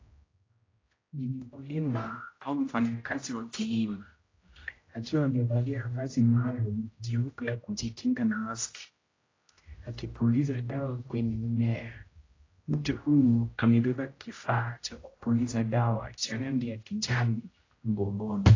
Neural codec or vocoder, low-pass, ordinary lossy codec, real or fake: codec, 16 kHz, 0.5 kbps, X-Codec, HuBERT features, trained on general audio; 7.2 kHz; AAC, 32 kbps; fake